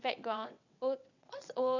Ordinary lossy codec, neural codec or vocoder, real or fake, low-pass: none; vocoder, 22.05 kHz, 80 mel bands, WaveNeXt; fake; 7.2 kHz